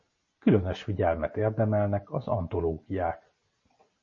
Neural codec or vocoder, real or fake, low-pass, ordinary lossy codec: none; real; 7.2 kHz; MP3, 32 kbps